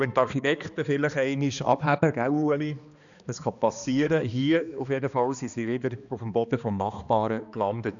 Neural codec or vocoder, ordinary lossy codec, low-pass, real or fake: codec, 16 kHz, 2 kbps, X-Codec, HuBERT features, trained on balanced general audio; none; 7.2 kHz; fake